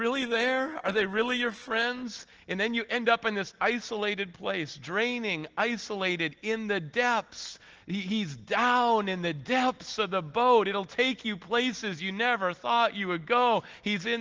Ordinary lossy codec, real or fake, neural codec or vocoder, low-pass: Opus, 16 kbps; real; none; 7.2 kHz